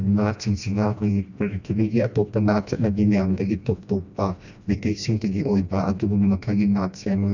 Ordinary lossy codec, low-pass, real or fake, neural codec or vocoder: none; 7.2 kHz; fake; codec, 16 kHz, 1 kbps, FreqCodec, smaller model